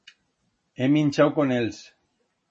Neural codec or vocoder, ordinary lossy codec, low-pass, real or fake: none; MP3, 32 kbps; 10.8 kHz; real